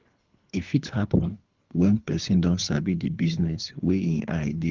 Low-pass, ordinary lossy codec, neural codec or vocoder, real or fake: 7.2 kHz; Opus, 32 kbps; codec, 24 kHz, 3 kbps, HILCodec; fake